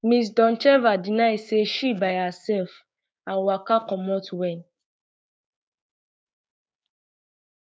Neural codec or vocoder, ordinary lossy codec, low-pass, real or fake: codec, 16 kHz, 6 kbps, DAC; none; none; fake